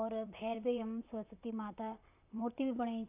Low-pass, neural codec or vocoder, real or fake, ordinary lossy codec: 3.6 kHz; vocoder, 44.1 kHz, 128 mel bands, Pupu-Vocoder; fake; AAC, 32 kbps